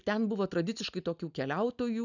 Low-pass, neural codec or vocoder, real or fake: 7.2 kHz; none; real